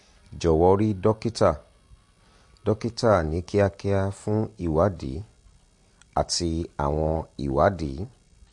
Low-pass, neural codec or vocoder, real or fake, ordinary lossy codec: 10.8 kHz; none; real; MP3, 48 kbps